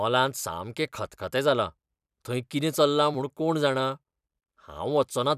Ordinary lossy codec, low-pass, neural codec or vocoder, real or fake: none; 14.4 kHz; vocoder, 44.1 kHz, 128 mel bands every 256 samples, BigVGAN v2; fake